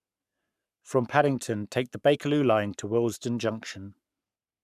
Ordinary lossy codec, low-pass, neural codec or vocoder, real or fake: none; 14.4 kHz; codec, 44.1 kHz, 7.8 kbps, Pupu-Codec; fake